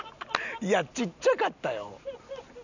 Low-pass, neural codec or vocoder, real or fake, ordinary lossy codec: 7.2 kHz; none; real; none